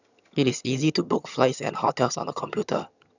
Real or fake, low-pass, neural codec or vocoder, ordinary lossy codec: fake; 7.2 kHz; vocoder, 22.05 kHz, 80 mel bands, HiFi-GAN; none